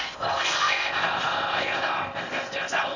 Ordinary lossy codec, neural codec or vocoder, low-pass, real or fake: none; codec, 16 kHz in and 24 kHz out, 0.6 kbps, FocalCodec, streaming, 4096 codes; 7.2 kHz; fake